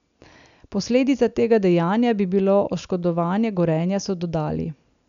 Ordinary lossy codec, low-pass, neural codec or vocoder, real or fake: none; 7.2 kHz; none; real